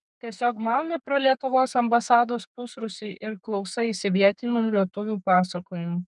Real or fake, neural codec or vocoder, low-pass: fake; codec, 32 kHz, 1.9 kbps, SNAC; 10.8 kHz